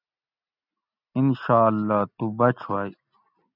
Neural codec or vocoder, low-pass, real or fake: none; 5.4 kHz; real